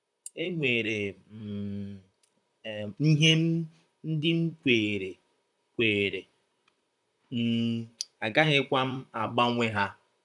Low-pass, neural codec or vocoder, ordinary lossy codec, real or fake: 10.8 kHz; vocoder, 44.1 kHz, 128 mel bands, Pupu-Vocoder; none; fake